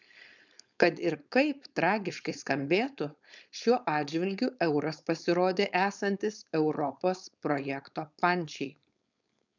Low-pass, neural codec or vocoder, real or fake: 7.2 kHz; codec, 16 kHz, 4.8 kbps, FACodec; fake